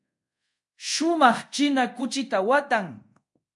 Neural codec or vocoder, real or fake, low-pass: codec, 24 kHz, 0.5 kbps, DualCodec; fake; 10.8 kHz